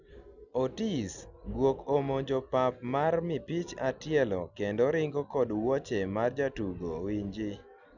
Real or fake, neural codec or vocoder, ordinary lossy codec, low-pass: real; none; none; 7.2 kHz